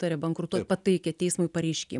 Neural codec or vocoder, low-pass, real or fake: none; 10.8 kHz; real